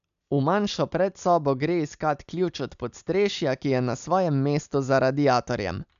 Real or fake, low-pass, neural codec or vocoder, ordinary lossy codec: real; 7.2 kHz; none; none